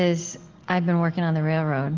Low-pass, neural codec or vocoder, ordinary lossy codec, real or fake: 7.2 kHz; none; Opus, 16 kbps; real